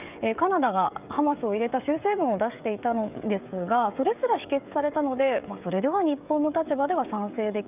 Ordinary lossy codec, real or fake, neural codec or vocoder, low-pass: none; fake; codec, 16 kHz, 16 kbps, FreqCodec, smaller model; 3.6 kHz